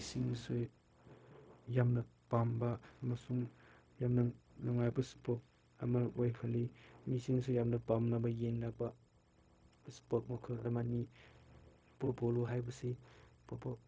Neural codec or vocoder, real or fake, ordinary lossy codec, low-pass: codec, 16 kHz, 0.4 kbps, LongCat-Audio-Codec; fake; none; none